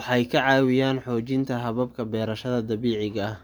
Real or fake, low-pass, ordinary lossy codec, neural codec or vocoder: fake; none; none; vocoder, 44.1 kHz, 128 mel bands every 512 samples, BigVGAN v2